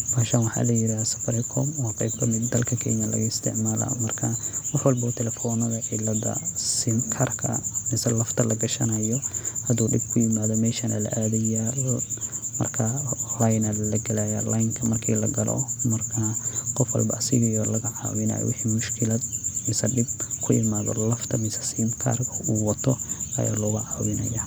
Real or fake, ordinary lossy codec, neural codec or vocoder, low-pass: real; none; none; none